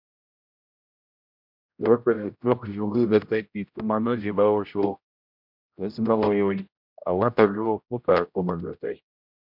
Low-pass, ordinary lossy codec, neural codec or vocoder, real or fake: 5.4 kHz; MP3, 48 kbps; codec, 16 kHz, 0.5 kbps, X-Codec, HuBERT features, trained on general audio; fake